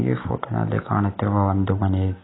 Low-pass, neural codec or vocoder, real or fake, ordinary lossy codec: 7.2 kHz; none; real; AAC, 16 kbps